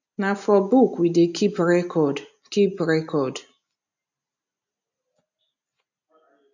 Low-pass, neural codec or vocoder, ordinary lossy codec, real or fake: 7.2 kHz; none; none; real